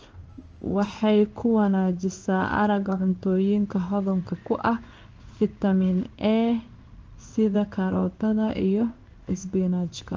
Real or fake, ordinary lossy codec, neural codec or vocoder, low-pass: fake; Opus, 24 kbps; codec, 16 kHz in and 24 kHz out, 1 kbps, XY-Tokenizer; 7.2 kHz